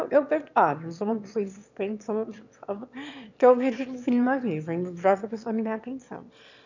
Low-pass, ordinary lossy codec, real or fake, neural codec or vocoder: 7.2 kHz; none; fake; autoencoder, 22.05 kHz, a latent of 192 numbers a frame, VITS, trained on one speaker